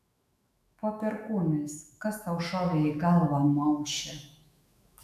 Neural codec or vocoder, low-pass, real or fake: autoencoder, 48 kHz, 128 numbers a frame, DAC-VAE, trained on Japanese speech; 14.4 kHz; fake